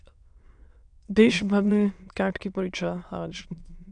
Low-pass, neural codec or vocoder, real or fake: 9.9 kHz; autoencoder, 22.05 kHz, a latent of 192 numbers a frame, VITS, trained on many speakers; fake